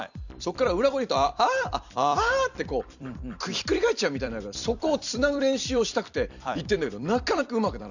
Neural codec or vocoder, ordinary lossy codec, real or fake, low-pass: vocoder, 44.1 kHz, 128 mel bands every 256 samples, BigVGAN v2; none; fake; 7.2 kHz